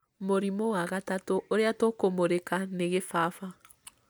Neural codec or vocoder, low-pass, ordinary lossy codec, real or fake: none; none; none; real